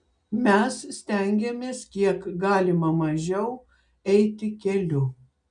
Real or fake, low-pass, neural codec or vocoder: real; 9.9 kHz; none